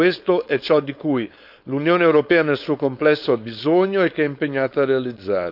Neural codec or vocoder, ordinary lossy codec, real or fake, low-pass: codec, 16 kHz, 4.8 kbps, FACodec; none; fake; 5.4 kHz